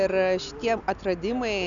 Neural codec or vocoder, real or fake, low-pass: none; real; 7.2 kHz